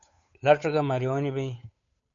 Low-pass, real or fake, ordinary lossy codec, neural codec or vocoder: 7.2 kHz; fake; MP3, 64 kbps; codec, 16 kHz, 8 kbps, FunCodec, trained on Chinese and English, 25 frames a second